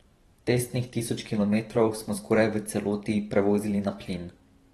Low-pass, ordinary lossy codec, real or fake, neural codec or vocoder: 19.8 kHz; AAC, 32 kbps; fake; vocoder, 44.1 kHz, 128 mel bands every 512 samples, BigVGAN v2